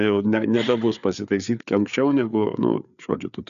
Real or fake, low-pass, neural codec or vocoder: fake; 7.2 kHz; codec, 16 kHz, 4 kbps, FreqCodec, larger model